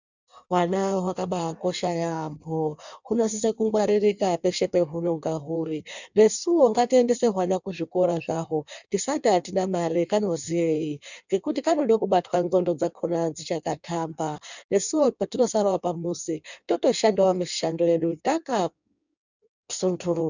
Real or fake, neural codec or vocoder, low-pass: fake; codec, 16 kHz in and 24 kHz out, 1.1 kbps, FireRedTTS-2 codec; 7.2 kHz